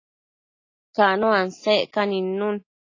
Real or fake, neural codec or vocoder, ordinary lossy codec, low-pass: real; none; AAC, 32 kbps; 7.2 kHz